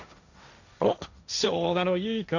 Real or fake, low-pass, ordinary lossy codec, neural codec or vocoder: fake; 7.2 kHz; none; codec, 16 kHz, 1.1 kbps, Voila-Tokenizer